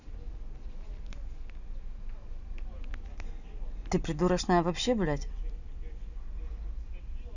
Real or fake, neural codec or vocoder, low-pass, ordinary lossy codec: real; none; 7.2 kHz; none